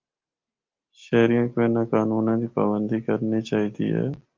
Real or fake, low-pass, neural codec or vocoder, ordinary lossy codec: real; 7.2 kHz; none; Opus, 24 kbps